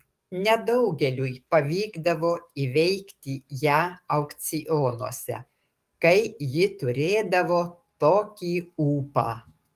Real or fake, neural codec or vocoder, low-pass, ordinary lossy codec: fake; codec, 44.1 kHz, 7.8 kbps, DAC; 14.4 kHz; Opus, 32 kbps